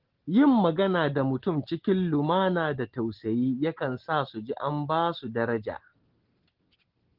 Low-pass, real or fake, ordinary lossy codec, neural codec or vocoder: 5.4 kHz; real; Opus, 16 kbps; none